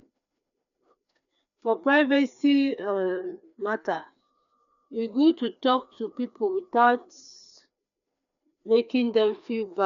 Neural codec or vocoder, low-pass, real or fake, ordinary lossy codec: codec, 16 kHz, 2 kbps, FreqCodec, larger model; 7.2 kHz; fake; none